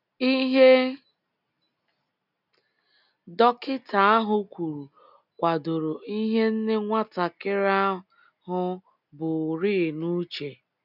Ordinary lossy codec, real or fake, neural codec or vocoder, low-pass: none; real; none; 5.4 kHz